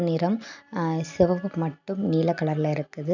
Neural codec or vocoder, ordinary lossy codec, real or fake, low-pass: none; none; real; 7.2 kHz